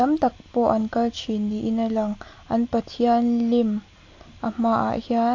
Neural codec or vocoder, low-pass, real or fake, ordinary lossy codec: none; 7.2 kHz; real; AAC, 48 kbps